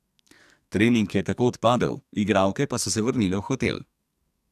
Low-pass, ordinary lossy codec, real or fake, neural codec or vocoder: 14.4 kHz; none; fake; codec, 44.1 kHz, 2.6 kbps, SNAC